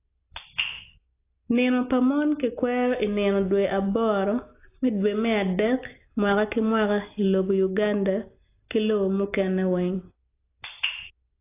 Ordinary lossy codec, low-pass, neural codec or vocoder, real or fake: AAC, 24 kbps; 3.6 kHz; none; real